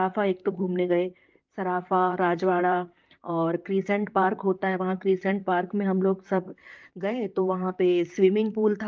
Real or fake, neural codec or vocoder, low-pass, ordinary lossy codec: fake; vocoder, 44.1 kHz, 128 mel bands, Pupu-Vocoder; 7.2 kHz; Opus, 32 kbps